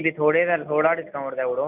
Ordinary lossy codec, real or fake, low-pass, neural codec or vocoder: none; real; 3.6 kHz; none